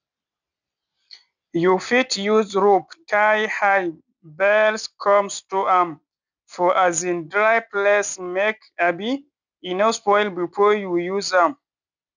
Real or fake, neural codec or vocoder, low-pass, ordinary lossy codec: real; none; 7.2 kHz; none